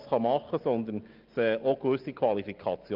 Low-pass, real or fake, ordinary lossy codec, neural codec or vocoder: 5.4 kHz; real; Opus, 24 kbps; none